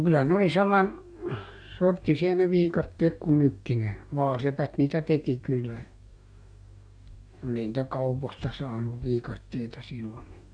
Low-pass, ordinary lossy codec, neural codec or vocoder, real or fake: 9.9 kHz; none; codec, 44.1 kHz, 2.6 kbps, DAC; fake